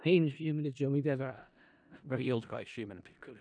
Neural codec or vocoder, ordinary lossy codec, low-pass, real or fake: codec, 16 kHz in and 24 kHz out, 0.4 kbps, LongCat-Audio-Codec, four codebook decoder; none; 9.9 kHz; fake